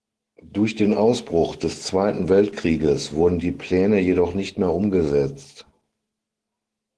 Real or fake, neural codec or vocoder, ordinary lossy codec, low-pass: fake; autoencoder, 48 kHz, 128 numbers a frame, DAC-VAE, trained on Japanese speech; Opus, 16 kbps; 10.8 kHz